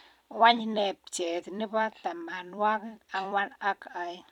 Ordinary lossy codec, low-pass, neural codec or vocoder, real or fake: none; 19.8 kHz; vocoder, 44.1 kHz, 128 mel bands every 256 samples, BigVGAN v2; fake